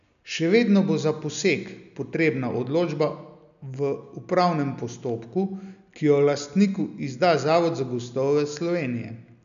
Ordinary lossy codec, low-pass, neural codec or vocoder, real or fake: none; 7.2 kHz; none; real